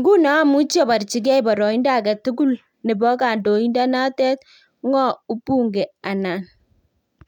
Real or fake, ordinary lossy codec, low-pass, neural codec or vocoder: real; none; 19.8 kHz; none